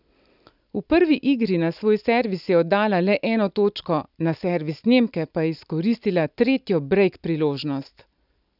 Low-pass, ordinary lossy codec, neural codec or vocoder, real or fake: 5.4 kHz; none; none; real